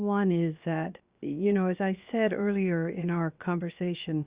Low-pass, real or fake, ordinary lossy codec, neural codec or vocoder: 3.6 kHz; fake; Opus, 64 kbps; codec, 16 kHz, 0.7 kbps, FocalCodec